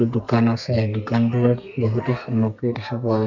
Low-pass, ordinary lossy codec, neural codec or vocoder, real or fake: 7.2 kHz; none; codec, 32 kHz, 1.9 kbps, SNAC; fake